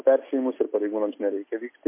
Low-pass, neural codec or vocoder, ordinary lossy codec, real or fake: 3.6 kHz; none; MP3, 24 kbps; real